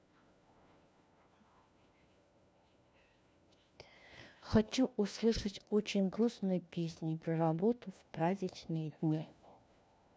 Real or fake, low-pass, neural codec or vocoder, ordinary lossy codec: fake; none; codec, 16 kHz, 1 kbps, FunCodec, trained on LibriTTS, 50 frames a second; none